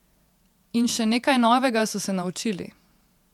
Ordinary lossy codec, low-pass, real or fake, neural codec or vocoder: MP3, 96 kbps; 19.8 kHz; fake; vocoder, 48 kHz, 128 mel bands, Vocos